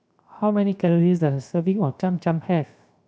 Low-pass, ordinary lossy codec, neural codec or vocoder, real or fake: none; none; codec, 16 kHz, 0.7 kbps, FocalCodec; fake